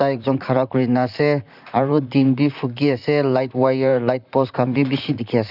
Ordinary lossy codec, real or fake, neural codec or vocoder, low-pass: none; fake; vocoder, 44.1 kHz, 128 mel bands, Pupu-Vocoder; 5.4 kHz